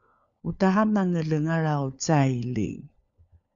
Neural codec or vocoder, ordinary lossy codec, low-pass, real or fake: codec, 16 kHz, 4 kbps, FunCodec, trained on LibriTTS, 50 frames a second; MP3, 96 kbps; 7.2 kHz; fake